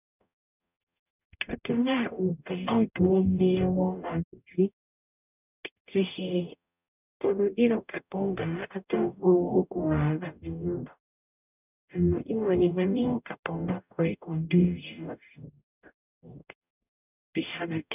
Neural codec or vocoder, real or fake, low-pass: codec, 44.1 kHz, 0.9 kbps, DAC; fake; 3.6 kHz